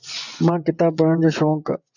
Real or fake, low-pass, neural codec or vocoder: fake; 7.2 kHz; vocoder, 24 kHz, 100 mel bands, Vocos